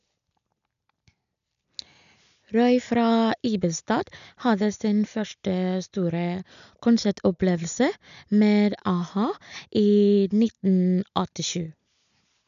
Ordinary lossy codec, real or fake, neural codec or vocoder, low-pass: none; real; none; 7.2 kHz